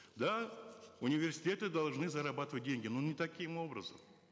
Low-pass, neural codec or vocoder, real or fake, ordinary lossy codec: none; none; real; none